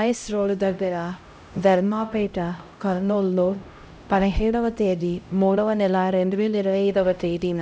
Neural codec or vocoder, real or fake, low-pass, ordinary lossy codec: codec, 16 kHz, 0.5 kbps, X-Codec, HuBERT features, trained on LibriSpeech; fake; none; none